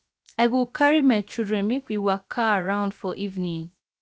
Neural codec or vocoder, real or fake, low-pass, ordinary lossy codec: codec, 16 kHz, 0.7 kbps, FocalCodec; fake; none; none